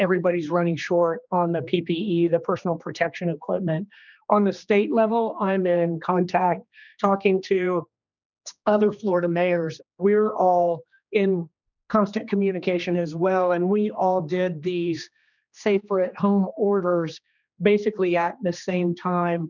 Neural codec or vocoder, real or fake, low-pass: codec, 16 kHz, 2 kbps, X-Codec, HuBERT features, trained on general audio; fake; 7.2 kHz